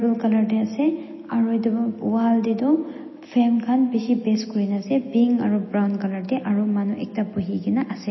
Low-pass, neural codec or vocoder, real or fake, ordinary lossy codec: 7.2 kHz; none; real; MP3, 24 kbps